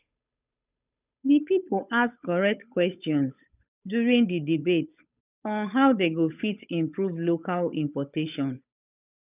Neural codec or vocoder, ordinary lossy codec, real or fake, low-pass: codec, 16 kHz, 8 kbps, FunCodec, trained on Chinese and English, 25 frames a second; none; fake; 3.6 kHz